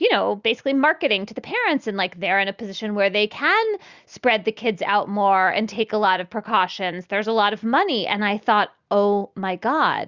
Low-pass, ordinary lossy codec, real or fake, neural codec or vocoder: 7.2 kHz; Opus, 64 kbps; real; none